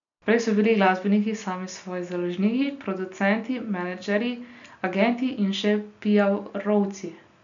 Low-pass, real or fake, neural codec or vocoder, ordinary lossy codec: 7.2 kHz; real; none; none